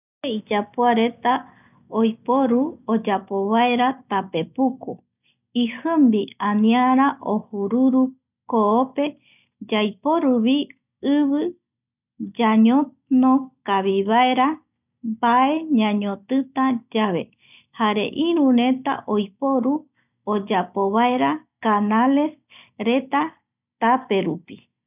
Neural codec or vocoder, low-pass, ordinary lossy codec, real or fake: none; 3.6 kHz; none; real